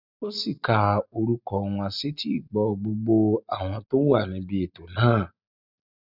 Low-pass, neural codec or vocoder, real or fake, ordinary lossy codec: 5.4 kHz; none; real; none